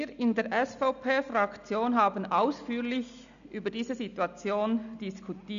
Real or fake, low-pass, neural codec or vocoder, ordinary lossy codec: real; 7.2 kHz; none; none